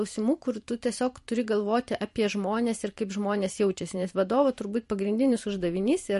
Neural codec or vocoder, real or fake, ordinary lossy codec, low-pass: vocoder, 44.1 kHz, 128 mel bands every 512 samples, BigVGAN v2; fake; MP3, 48 kbps; 14.4 kHz